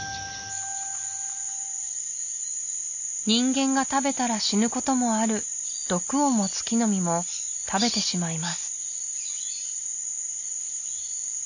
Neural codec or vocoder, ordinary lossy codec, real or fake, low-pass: none; none; real; 7.2 kHz